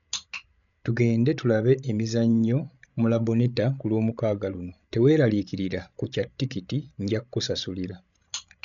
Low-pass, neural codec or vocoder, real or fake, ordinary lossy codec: 7.2 kHz; codec, 16 kHz, 16 kbps, FreqCodec, larger model; fake; none